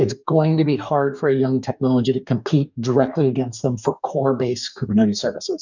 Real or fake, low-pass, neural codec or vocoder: fake; 7.2 kHz; codec, 44.1 kHz, 2.6 kbps, DAC